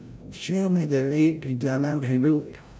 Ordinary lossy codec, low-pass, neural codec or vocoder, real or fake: none; none; codec, 16 kHz, 0.5 kbps, FreqCodec, larger model; fake